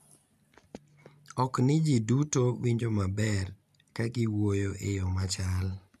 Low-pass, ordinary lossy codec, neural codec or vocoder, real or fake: 14.4 kHz; none; none; real